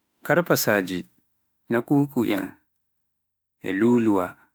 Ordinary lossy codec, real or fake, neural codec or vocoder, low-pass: none; fake; autoencoder, 48 kHz, 32 numbers a frame, DAC-VAE, trained on Japanese speech; none